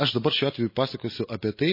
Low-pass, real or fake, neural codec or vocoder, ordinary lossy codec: 5.4 kHz; real; none; MP3, 24 kbps